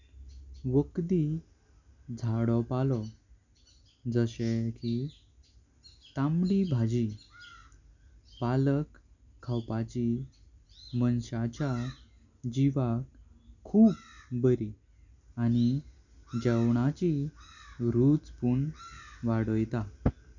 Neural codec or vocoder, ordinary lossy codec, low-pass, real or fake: none; none; 7.2 kHz; real